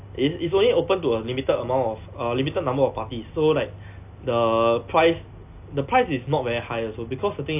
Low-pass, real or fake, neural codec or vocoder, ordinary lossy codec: 3.6 kHz; real; none; AAC, 32 kbps